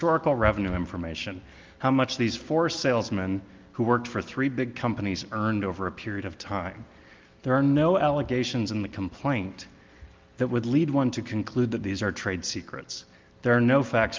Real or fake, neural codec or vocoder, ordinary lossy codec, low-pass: real; none; Opus, 32 kbps; 7.2 kHz